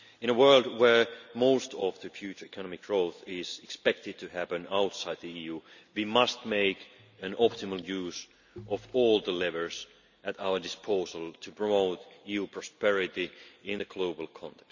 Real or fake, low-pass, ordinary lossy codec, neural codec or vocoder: real; 7.2 kHz; none; none